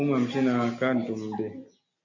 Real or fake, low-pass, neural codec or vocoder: real; 7.2 kHz; none